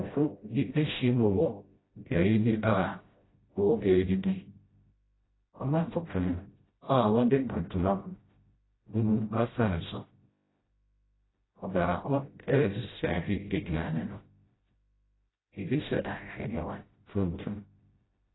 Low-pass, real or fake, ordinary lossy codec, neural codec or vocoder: 7.2 kHz; fake; AAC, 16 kbps; codec, 16 kHz, 0.5 kbps, FreqCodec, smaller model